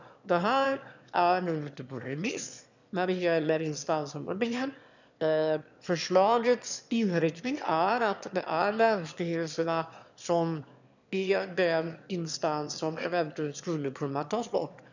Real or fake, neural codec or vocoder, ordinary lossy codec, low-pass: fake; autoencoder, 22.05 kHz, a latent of 192 numbers a frame, VITS, trained on one speaker; none; 7.2 kHz